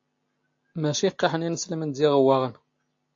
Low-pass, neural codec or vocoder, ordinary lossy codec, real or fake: 7.2 kHz; none; AAC, 48 kbps; real